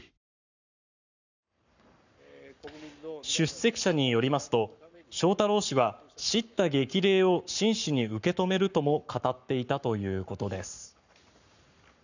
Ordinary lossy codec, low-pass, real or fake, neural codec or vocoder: none; 7.2 kHz; fake; codec, 44.1 kHz, 7.8 kbps, Pupu-Codec